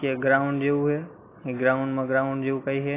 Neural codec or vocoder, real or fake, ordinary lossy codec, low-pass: none; real; AAC, 24 kbps; 3.6 kHz